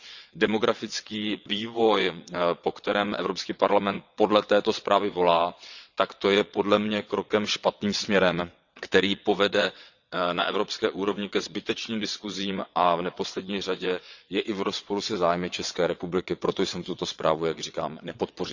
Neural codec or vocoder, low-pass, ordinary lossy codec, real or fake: vocoder, 22.05 kHz, 80 mel bands, WaveNeXt; 7.2 kHz; none; fake